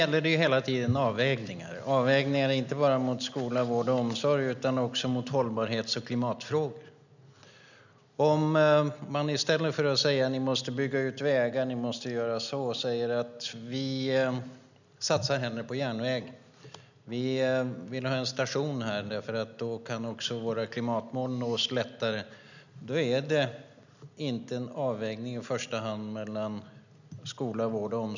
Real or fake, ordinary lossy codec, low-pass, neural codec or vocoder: real; none; 7.2 kHz; none